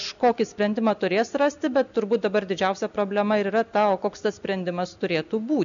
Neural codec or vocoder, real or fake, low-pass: none; real; 7.2 kHz